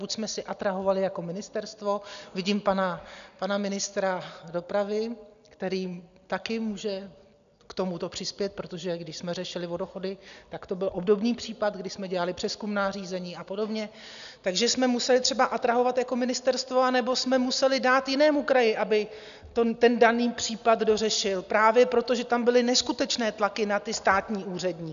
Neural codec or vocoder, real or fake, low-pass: none; real; 7.2 kHz